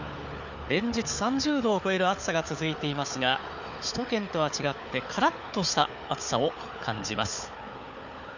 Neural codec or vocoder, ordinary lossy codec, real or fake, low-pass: codec, 16 kHz, 4 kbps, FunCodec, trained on Chinese and English, 50 frames a second; none; fake; 7.2 kHz